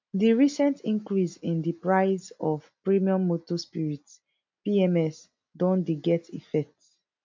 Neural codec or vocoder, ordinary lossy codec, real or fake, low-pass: none; MP3, 64 kbps; real; 7.2 kHz